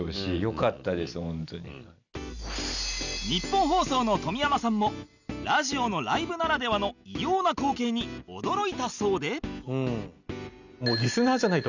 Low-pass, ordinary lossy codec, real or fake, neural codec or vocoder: 7.2 kHz; none; real; none